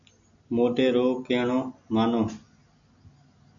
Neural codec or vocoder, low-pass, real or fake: none; 7.2 kHz; real